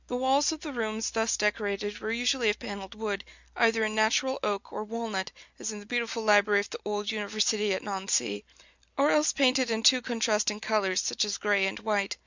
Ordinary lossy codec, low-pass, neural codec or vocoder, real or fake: Opus, 64 kbps; 7.2 kHz; none; real